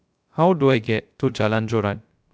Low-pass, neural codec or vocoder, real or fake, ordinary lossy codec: none; codec, 16 kHz, 0.3 kbps, FocalCodec; fake; none